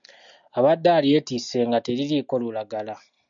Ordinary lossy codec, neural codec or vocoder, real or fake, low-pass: MP3, 64 kbps; none; real; 7.2 kHz